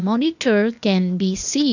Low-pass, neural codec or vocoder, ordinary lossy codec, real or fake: 7.2 kHz; codec, 16 kHz, 0.8 kbps, ZipCodec; none; fake